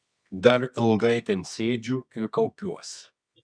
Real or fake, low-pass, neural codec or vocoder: fake; 9.9 kHz; codec, 24 kHz, 0.9 kbps, WavTokenizer, medium music audio release